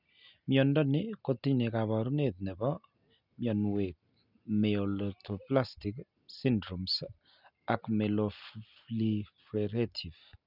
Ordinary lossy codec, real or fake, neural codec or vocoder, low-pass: none; real; none; 5.4 kHz